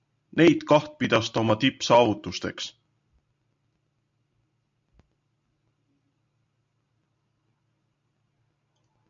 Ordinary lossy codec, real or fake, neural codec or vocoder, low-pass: AAC, 64 kbps; real; none; 7.2 kHz